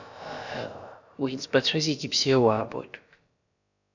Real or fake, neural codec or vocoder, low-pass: fake; codec, 16 kHz, about 1 kbps, DyCAST, with the encoder's durations; 7.2 kHz